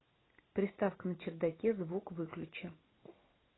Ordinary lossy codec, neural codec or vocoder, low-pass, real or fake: AAC, 16 kbps; none; 7.2 kHz; real